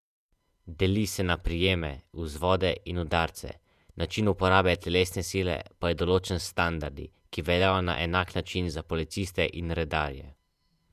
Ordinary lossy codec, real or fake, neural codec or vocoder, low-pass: none; fake; vocoder, 48 kHz, 128 mel bands, Vocos; 14.4 kHz